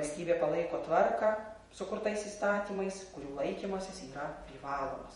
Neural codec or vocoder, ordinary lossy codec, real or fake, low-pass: vocoder, 48 kHz, 128 mel bands, Vocos; MP3, 48 kbps; fake; 19.8 kHz